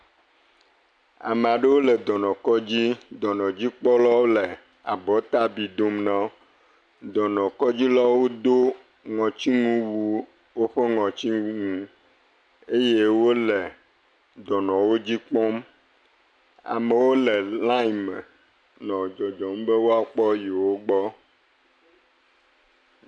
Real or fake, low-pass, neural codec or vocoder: real; 9.9 kHz; none